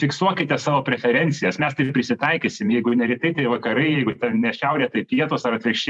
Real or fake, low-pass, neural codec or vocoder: fake; 10.8 kHz; vocoder, 44.1 kHz, 128 mel bands every 512 samples, BigVGAN v2